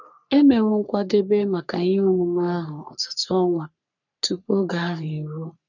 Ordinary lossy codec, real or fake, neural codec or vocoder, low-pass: none; fake; codec, 44.1 kHz, 3.4 kbps, Pupu-Codec; 7.2 kHz